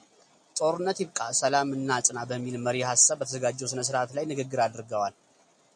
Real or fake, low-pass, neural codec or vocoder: real; 9.9 kHz; none